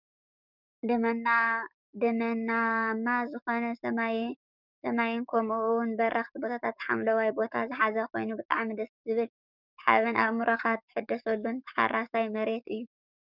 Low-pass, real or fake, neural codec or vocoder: 5.4 kHz; fake; codec, 44.1 kHz, 7.8 kbps, DAC